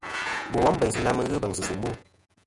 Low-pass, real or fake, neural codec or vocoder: 10.8 kHz; fake; vocoder, 48 kHz, 128 mel bands, Vocos